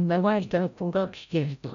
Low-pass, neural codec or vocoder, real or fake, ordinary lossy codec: 7.2 kHz; codec, 16 kHz, 0.5 kbps, FreqCodec, larger model; fake; none